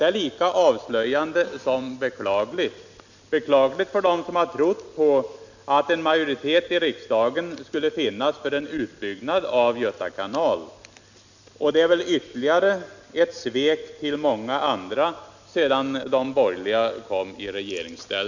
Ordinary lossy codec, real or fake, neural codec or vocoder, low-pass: none; real; none; 7.2 kHz